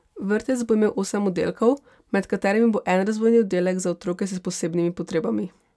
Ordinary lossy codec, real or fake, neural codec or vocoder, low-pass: none; real; none; none